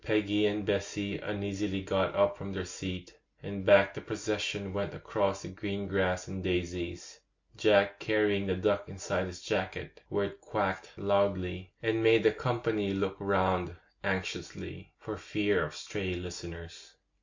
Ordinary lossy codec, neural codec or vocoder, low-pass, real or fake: MP3, 48 kbps; none; 7.2 kHz; real